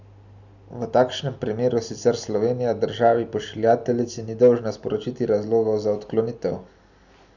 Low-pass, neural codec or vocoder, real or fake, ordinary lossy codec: 7.2 kHz; none; real; none